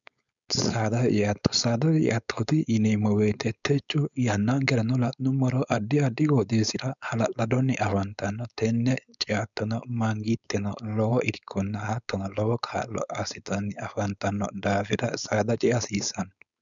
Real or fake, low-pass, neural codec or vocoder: fake; 7.2 kHz; codec, 16 kHz, 4.8 kbps, FACodec